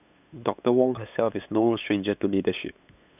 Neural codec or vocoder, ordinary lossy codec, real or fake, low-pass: codec, 16 kHz, 8 kbps, FunCodec, trained on LibriTTS, 25 frames a second; none; fake; 3.6 kHz